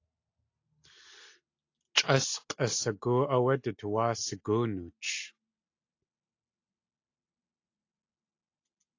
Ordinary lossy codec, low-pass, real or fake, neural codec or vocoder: AAC, 48 kbps; 7.2 kHz; real; none